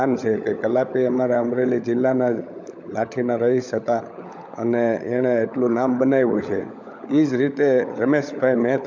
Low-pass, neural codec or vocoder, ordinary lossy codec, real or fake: 7.2 kHz; codec, 16 kHz, 16 kbps, FunCodec, trained on LibriTTS, 50 frames a second; none; fake